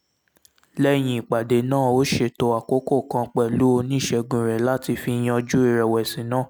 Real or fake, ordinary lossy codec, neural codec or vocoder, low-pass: real; none; none; none